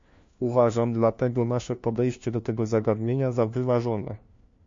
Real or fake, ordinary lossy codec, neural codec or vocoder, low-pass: fake; MP3, 48 kbps; codec, 16 kHz, 1 kbps, FunCodec, trained on LibriTTS, 50 frames a second; 7.2 kHz